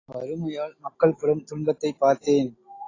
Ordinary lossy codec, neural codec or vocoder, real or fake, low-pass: AAC, 32 kbps; none; real; 7.2 kHz